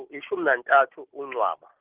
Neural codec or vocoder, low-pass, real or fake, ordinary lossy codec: none; 3.6 kHz; real; Opus, 16 kbps